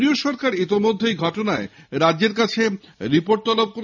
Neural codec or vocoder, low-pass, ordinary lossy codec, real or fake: none; 7.2 kHz; none; real